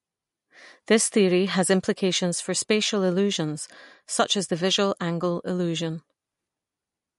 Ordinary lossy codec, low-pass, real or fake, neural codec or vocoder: MP3, 48 kbps; 14.4 kHz; real; none